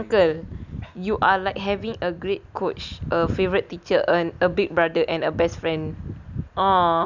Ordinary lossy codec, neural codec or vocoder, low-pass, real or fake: none; none; 7.2 kHz; real